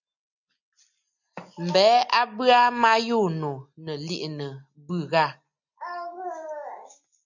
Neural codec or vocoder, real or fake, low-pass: none; real; 7.2 kHz